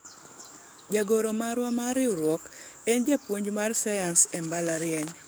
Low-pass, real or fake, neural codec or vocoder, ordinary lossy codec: none; fake; codec, 44.1 kHz, 7.8 kbps, DAC; none